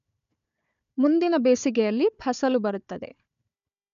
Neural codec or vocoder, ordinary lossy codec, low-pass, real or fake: codec, 16 kHz, 4 kbps, FunCodec, trained on Chinese and English, 50 frames a second; none; 7.2 kHz; fake